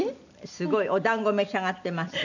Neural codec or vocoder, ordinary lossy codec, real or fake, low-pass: none; none; real; 7.2 kHz